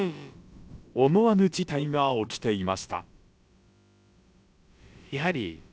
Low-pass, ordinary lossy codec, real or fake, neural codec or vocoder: none; none; fake; codec, 16 kHz, about 1 kbps, DyCAST, with the encoder's durations